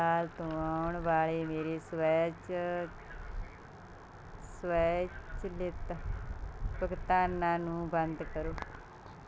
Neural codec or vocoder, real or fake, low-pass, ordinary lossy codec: none; real; none; none